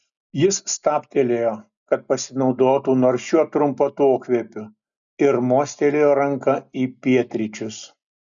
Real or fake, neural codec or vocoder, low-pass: real; none; 7.2 kHz